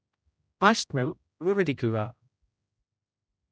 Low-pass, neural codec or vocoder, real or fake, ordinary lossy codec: none; codec, 16 kHz, 0.5 kbps, X-Codec, HuBERT features, trained on general audio; fake; none